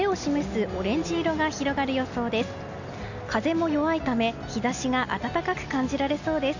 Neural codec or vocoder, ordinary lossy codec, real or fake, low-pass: none; none; real; 7.2 kHz